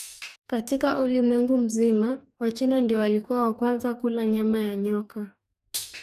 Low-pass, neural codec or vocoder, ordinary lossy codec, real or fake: 14.4 kHz; codec, 44.1 kHz, 2.6 kbps, DAC; none; fake